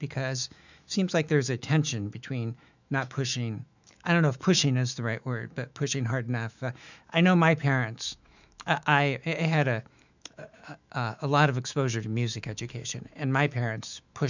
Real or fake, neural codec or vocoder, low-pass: fake; autoencoder, 48 kHz, 128 numbers a frame, DAC-VAE, trained on Japanese speech; 7.2 kHz